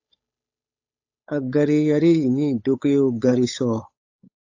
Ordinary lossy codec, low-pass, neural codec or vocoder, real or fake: AAC, 48 kbps; 7.2 kHz; codec, 16 kHz, 8 kbps, FunCodec, trained on Chinese and English, 25 frames a second; fake